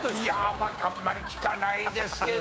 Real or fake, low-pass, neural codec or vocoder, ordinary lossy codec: fake; none; codec, 16 kHz, 6 kbps, DAC; none